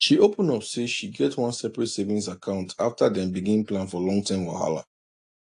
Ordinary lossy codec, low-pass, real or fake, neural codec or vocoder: AAC, 48 kbps; 10.8 kHz; real; none